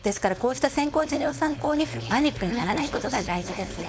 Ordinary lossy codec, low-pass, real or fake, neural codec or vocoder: none; none; fake; codec, 16 kHz, 4.8 kbps, FACodec